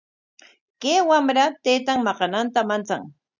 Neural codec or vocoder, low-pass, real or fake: none; 7.2 kHz; real